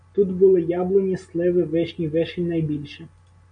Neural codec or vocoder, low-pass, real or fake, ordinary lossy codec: none; 9.9 kHz; real; MP3, 96 kbps